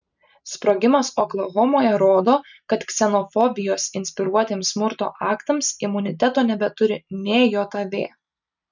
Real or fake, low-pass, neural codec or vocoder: real; 7.2 kHz; none